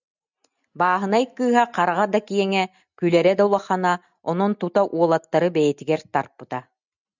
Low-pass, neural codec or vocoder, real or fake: 7.2 kHz; none; real